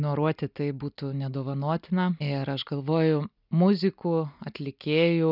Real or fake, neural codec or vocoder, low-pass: real; none; 5.4 kHz